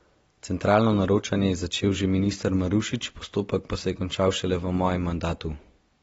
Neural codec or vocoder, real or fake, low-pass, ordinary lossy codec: none; real; 10.8 kHz; AAC, 24 kbps